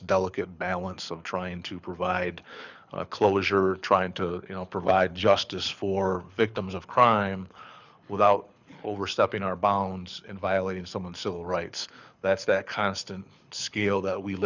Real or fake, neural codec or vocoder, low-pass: fake; codec, 24 kHz, 6 kbps, HILCodec; 7.2 kHz